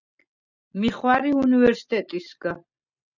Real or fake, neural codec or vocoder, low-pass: real; none; 7.2 kHz